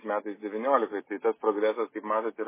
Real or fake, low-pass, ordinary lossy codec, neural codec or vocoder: real; 3.6 kHz; MP3, 16 kbps; none